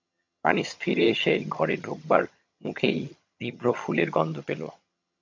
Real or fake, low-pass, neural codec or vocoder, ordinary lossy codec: fake; 7.2 kHz; vocoder, 22.05 kHz, 80 mel bands, HiFi-GAN; MP3, 48 kbps